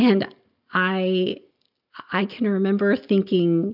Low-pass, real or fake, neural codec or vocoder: 5.4 kHz; real; none